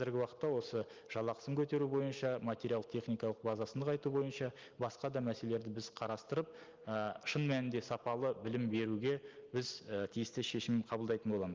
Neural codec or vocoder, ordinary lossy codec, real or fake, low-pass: none; Opus, 32 kbps; real; 7.2 kHz